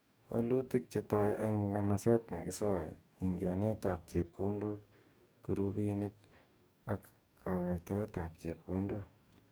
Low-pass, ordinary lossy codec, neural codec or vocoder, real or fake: none; none; codec, 44.1 kHz, 2.6 kbps, DAC; fake